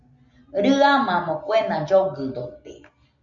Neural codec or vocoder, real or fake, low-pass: none; real; 7.2 kHz